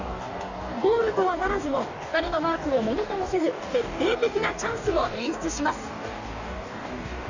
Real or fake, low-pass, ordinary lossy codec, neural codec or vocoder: fake; 7.2 kHz; none; codec, 44.1 kHz, 2.6 kbps, DAC